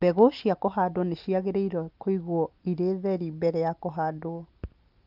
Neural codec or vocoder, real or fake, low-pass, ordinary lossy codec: none; real; 5.4 kHz; Opus, 32 kbps